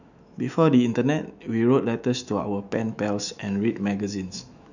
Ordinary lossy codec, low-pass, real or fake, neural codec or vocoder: none; 7.2 kHz; fake; autoencoder, 48 kHz, 128 numbers a frame, DAC-VAE, trained on Japanese speech